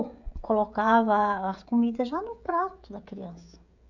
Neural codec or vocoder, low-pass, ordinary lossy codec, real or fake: codec, 16 kHz, 16 kbps, FreqCodec, smaller model; 7.2 kHz; none; fake